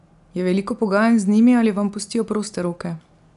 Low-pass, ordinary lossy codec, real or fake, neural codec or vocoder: 10.8 kHz; none; real; none